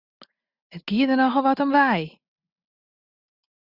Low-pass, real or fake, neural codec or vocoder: 5.4 kHz; real; none